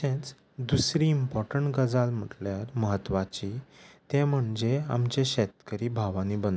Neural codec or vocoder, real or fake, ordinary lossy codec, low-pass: none; real; none; none